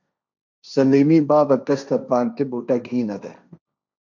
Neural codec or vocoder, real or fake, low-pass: codec, 16 kHz, 1.1 kbps, Voila-Tokenizer; fake; 7.2 kHz